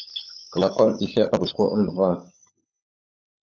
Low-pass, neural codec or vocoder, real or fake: 7.2 kHz; codec, 16 kHz, 16 kbps, FunCodec, trained on LibriTTS, 50 frames a second; fake